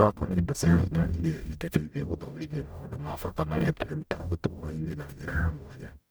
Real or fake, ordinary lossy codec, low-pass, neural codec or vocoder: fake; none; none; codec, 44.1 kHz, 0.9 kbps, DAC